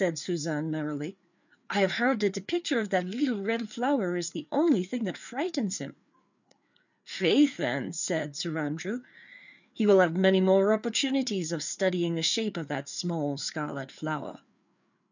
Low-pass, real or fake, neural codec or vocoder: 7.2 kHz; fake; codec, 16 kHz, 4 kbps, FreqCodec, larger model